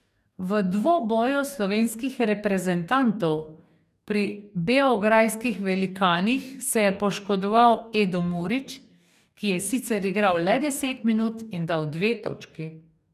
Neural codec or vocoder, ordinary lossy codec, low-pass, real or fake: codec, 44.1 kHz, 2.6 kbps, DAC; none; 14.4 kHz; fake